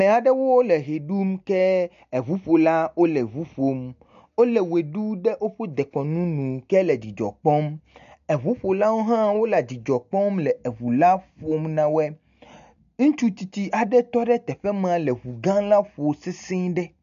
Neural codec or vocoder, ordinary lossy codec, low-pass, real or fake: none; AAC, 96 kbps; 7.2 kHz; real